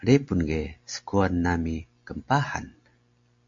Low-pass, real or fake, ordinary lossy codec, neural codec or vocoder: 7.2 kHz; real; AAC, 48 kbps; none